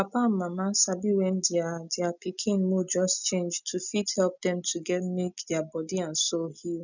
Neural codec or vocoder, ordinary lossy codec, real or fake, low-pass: none; none; real; 7.2 kHz